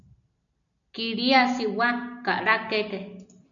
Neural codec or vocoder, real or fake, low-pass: none; real; 7.2 kHz